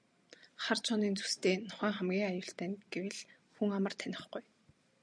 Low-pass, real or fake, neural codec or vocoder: 9.9 kHz; real; none